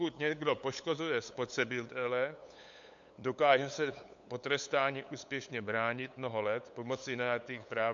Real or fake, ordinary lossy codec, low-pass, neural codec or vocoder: fake; MP3, 64 kbps; 7.2 kHz; codec, 16 kHz, 8 kbps, FunCodec, trained on LibriTTS, 25 frames a second